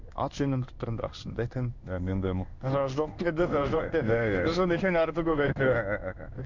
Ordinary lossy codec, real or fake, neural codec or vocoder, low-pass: none; fake; codec, 16 kHz in and 24 kHz out, 1 kbps, XY-Tokenizer; 7.2 kHz